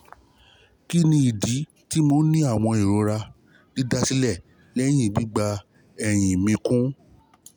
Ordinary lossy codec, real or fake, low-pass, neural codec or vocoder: none; real; none; none